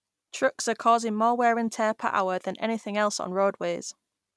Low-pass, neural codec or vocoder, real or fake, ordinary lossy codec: none; none; real; none